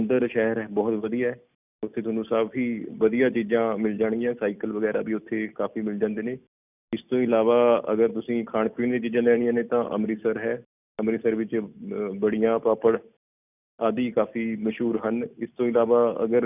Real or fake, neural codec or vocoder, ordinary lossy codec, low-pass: real; none; none; 3.6 kHz